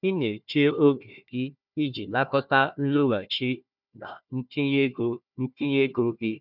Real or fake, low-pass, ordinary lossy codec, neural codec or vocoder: fake; 5.4 kHz; none; codec, 16 kHz, 1 kbps, FunCodec, trained on Chinese and English, 50 frames a second